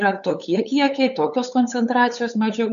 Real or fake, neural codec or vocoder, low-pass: fake; codec, 16 kHz, 8 kbps, FunCodec, trained on LibriTTS, 25 frames a second; 7.2 kHz